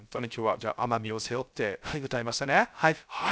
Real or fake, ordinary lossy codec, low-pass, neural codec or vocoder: fake; none; none; codec, 16 kHz, 0.3 kbps, FocalCodec